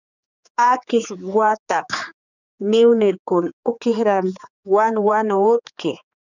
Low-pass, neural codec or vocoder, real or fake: 7.2 kHz; codec, 16 kHz, 4 kbps, X-Codec, HuBERT features, trained on general audio; fake